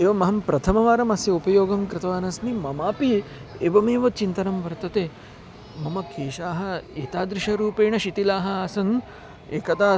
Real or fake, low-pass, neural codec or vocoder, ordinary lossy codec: real; none; none; none